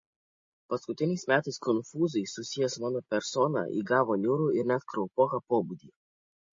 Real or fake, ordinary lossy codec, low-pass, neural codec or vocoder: real; MP3, 32 kbps; 7.2 kHz; none